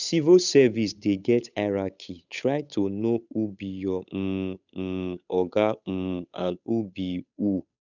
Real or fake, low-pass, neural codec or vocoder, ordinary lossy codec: fake; 7.2 kHz; codec, 16 kHz, 8 kbps, FunCodec, trained on Chinese and English, 25 frames a second; none